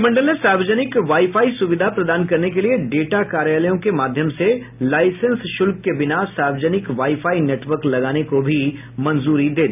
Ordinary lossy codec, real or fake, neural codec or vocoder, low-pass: none; real; none; 3.6 kHz